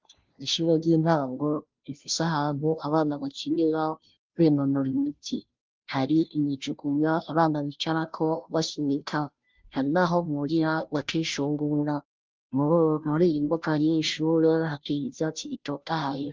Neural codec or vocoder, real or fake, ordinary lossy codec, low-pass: codec, 16 kHz, 0.5 kbps, FunCodec, trained on Chinese and English, 25 frames a second; fake; Opus, 32 kbps; 7.2 kHz